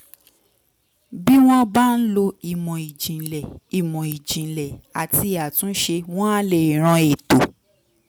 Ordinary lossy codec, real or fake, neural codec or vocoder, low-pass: none; real; none; none